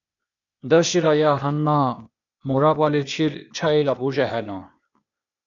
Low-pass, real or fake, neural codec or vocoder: 7.2 kHz; fake; codec, 16 kHz, 0.8 kbps, ZipCodec